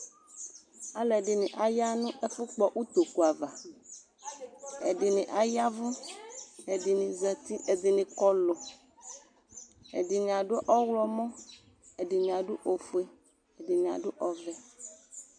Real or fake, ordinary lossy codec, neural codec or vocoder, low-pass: real; MP3, 96 kbps; none; 9.9 kHz